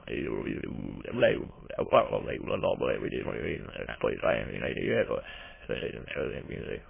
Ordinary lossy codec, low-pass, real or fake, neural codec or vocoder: MP3, 16 kbps; 3.6 kHz; fake; autoencoder, 22.05 kHz, a latent of 192 numbers a frame, VITS, trained on many speakers